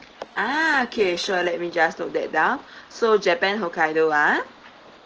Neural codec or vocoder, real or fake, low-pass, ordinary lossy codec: none; real; 7.2 kHz; Opus, 16 kbps